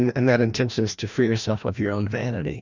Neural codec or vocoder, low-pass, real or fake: codec, 16 kHz, 1 kbps, FreqCodec, larger model; 7.2 kHz; fake